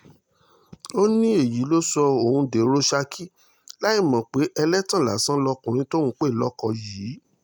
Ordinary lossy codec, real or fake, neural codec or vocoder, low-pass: none; real; none; none